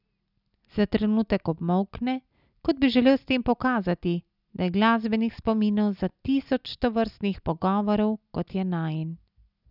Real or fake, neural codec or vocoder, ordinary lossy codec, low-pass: real; none; none; 5.4 kHz